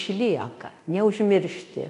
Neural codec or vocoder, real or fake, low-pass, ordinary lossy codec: codec, 24 kHz, 0.9 kbps, DualCodec; fake; 10.8 kHz; AAC, 64 kbps